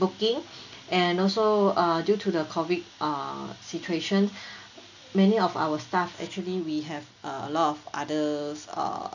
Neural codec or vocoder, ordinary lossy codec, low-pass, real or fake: none; none; 7.2 kHz; real